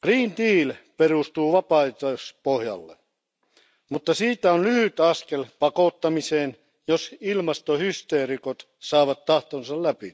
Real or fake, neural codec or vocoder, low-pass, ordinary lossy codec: real; none; none; none